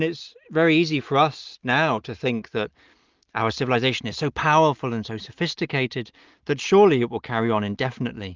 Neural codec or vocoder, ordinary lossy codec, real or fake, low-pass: none; Opus, 32 kbps; real; 7.2 kHz